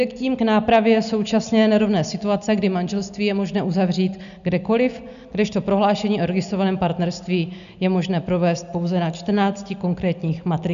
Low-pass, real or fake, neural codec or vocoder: 7.2 kHz; real; none